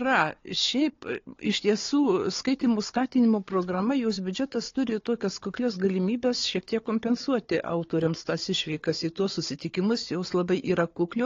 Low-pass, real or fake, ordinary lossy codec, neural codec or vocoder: 7.2 kHz; fake; AAC, 32 kbps; codec, 16 kHz, 4 kbps, FunCodec, trained on Chinese and English, 50 frames a second